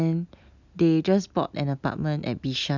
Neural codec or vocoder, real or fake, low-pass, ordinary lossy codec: none; real; 7.2 kHz; none